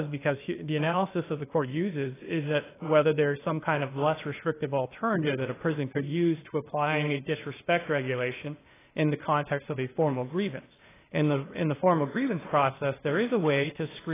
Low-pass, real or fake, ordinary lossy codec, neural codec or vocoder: 3.6 kHz; fake; AAC, 16 kbps; codec, 16 kHz, 0.8 kbps, ZipCodec